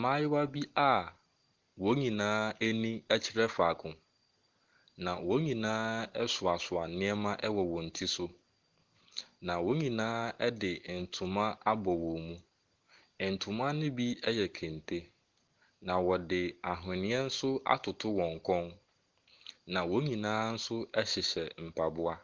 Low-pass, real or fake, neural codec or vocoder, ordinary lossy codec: 7.2 kHz; real; none; Opus, 16 kbps